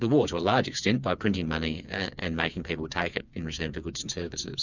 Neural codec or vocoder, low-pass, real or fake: codec, 16 kHz, 4 kbps, FreqCodec, smaller model; 7.2 kHz; fake